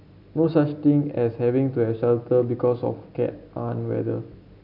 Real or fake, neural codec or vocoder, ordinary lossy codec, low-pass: real; none; none; 5.4 kHz